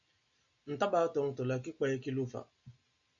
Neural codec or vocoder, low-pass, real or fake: none; 7.2 kHz; real